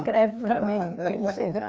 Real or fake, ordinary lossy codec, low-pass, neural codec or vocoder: fake; none; none; codec, 16 kHz, 2 kbps, FreqCodec, larger model